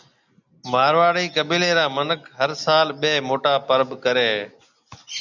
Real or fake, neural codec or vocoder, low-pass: real; none; 7.2 kHz